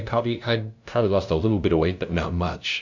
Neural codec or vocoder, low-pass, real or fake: codec, 16 kHz, 0.5 kbps, FunCodec, trained on LibriTTS, 25 frames a second; 7.2 kHz; fake